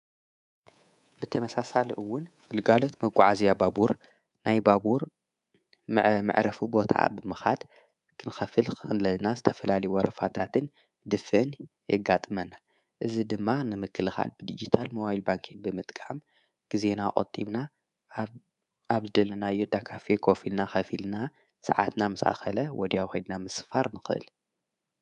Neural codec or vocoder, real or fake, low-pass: codec, 24 kHz, 3.1 kbps, DualCodec; fake; 10.8 kHz